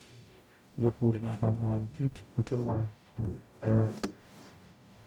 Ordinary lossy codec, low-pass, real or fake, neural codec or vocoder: none; 19.8 kHz; fake; codec, 44.1 kHz, 0.9 kbps, DAC